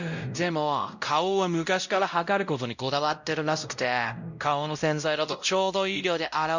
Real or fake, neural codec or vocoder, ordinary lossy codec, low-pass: fake; codec, 16 kHz, 0.5 kbps, X-Codec, WavLM features, trained on Multilingual LibriSpeech; none; 7.2 kHz